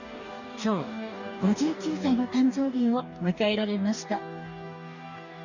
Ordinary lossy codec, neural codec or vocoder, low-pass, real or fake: none; codec, 44.1 kHz, 2.6 kbps, DAC; 7.2 kHz; fake